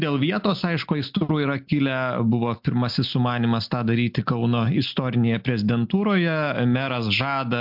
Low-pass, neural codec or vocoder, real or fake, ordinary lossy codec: 5.4 kHz; none; real; MP3, 48 kbps